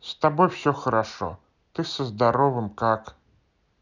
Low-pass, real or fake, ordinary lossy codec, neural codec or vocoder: 7.2 kHz; real; none; none